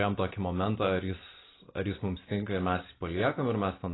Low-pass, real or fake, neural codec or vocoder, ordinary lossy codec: 7.2 kHz; real; none; AAC, 16 kbps